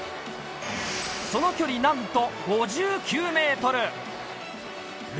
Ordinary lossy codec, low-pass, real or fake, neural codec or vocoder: none; none; real; none